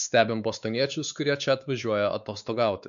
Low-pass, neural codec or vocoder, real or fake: 7.2 kHz; codec, 16 kHz, 4 kbps, X-Codec, WavLM features, trained on Multilingual LibriSpeech; fake